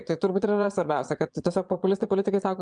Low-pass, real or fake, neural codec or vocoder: 9.9 kHz; fake; vocoder, 22.05 kHz, 80 mel bands, Vocos